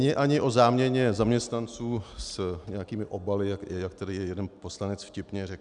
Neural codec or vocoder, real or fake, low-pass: none; real; 10.8 kHz